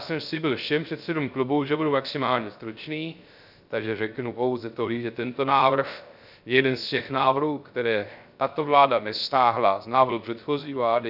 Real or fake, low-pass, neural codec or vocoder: fake; 5.4 kHz; codec, 16 kHz, 0.3 kbps, FocalCodec